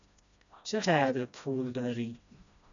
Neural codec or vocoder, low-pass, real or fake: codec, 16 kHz, 1 kbps, FreqCodec, smaller model; 7.2 kHz; fake